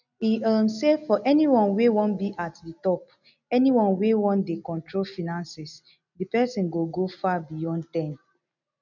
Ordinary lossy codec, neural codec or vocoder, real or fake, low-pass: none; none; real; 7.2 kHz